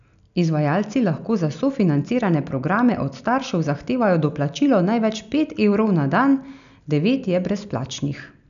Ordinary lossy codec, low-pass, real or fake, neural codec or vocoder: none; 7.2 kHz; real; none